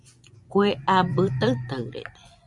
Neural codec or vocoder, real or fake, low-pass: vocoder, 24 kHz, 100 mel bands, Vocos; fake; 10.8 kHz